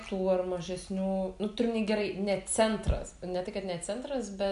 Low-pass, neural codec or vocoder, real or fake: 10.8 kHz; none; real